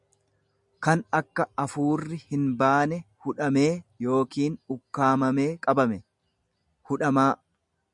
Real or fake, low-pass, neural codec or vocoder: real; 10.8 kHz; none